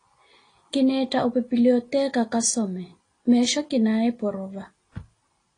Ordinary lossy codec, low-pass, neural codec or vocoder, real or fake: AAC, 32 kbps; 9.9 kHz; none; real